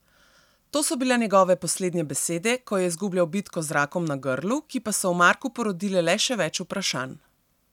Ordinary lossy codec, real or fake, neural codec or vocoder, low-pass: none; real; none; 19.8 kHz